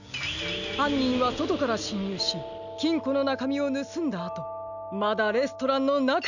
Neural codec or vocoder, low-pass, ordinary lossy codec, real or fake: none; 7.2 kHz; none; real